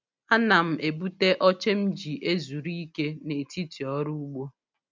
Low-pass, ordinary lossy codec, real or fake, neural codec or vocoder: none; none; real; none